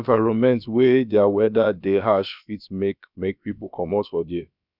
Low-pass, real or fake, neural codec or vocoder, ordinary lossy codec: 5.4 kHz; fake; codec, 16 kHz, about 1 kbps, DyCAST, with the encoder's durations; none